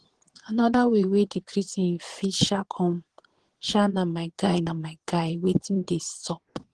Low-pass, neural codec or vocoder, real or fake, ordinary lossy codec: 9.9 kHz; vocoder, 22.05 kHz, 80 mel bands, Vocos; fake; Opus, 16 kbps